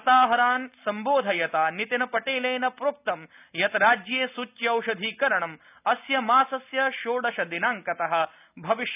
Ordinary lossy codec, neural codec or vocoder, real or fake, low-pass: none; none; real; 3.6 kHz